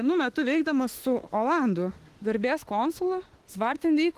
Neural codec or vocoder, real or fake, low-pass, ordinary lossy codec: autoencoder, 48 kHz, 32 numbers a frame, DAC-VAE, trained on Japanese speech; fake; 14.4 kHz; Opus, 16 kbps